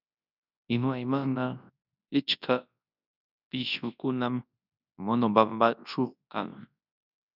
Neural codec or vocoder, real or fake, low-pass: codec, 24 kHz, 0.9 kbps, WavTokenizer, large speech release; fake; 5.4 kHz